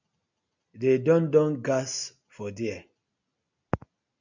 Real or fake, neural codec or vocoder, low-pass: real; none; 7.2 kHz